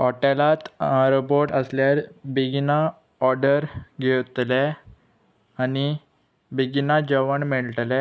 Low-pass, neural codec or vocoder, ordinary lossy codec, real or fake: none; none; none; real